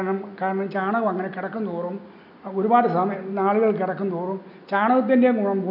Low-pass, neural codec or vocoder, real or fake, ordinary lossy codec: 5.4 kHz; none; real; none